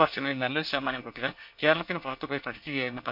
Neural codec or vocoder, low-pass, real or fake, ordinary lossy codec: codec, 24 kHz, 1 kbps, SNAC; 5.4 kHz; fake; MP3, 48 kbps